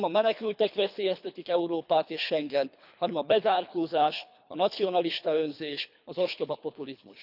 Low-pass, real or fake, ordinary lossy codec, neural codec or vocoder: 5.4 kHz; fake; none; codec, 24 kHz, 3 kbps, HILCodec